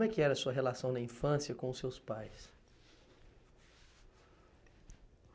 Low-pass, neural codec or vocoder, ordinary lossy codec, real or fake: none; none; none; real